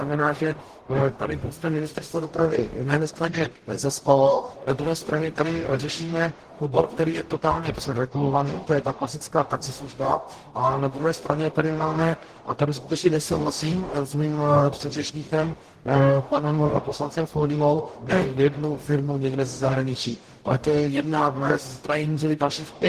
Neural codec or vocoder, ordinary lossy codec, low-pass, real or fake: codec, 44.1 kHz, 0.9 kbps, DAC; Opus, 16 kbps; 14.4 kHz; fake